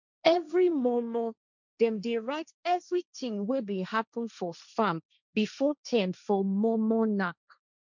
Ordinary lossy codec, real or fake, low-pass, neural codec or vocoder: none; fake; 7.2 kHz; codec, 16 kHz, 1.1 kbps, Voila-Tokenizer